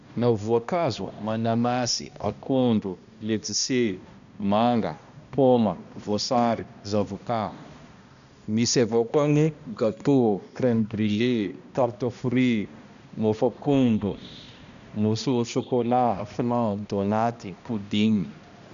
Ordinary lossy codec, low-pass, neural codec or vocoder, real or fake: none; 7.2 kHz; codec, 16 kHz, 1 kbps, X-Codec, HuBERT features, trained on balanced general audio; fake